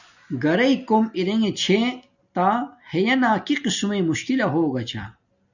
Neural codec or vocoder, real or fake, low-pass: none; real; 7.2 kHz